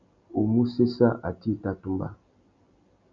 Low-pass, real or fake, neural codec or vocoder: 7.2 kHz; real; none